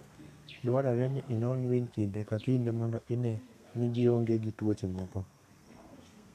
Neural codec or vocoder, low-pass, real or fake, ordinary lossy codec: codec, 32 kHz, 1.9 kbps, SNAC; 14.4 kHz; fake; none